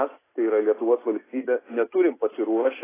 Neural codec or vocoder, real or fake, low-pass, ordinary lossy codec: none; real; 3.6 kHz; AAC, 16 kbps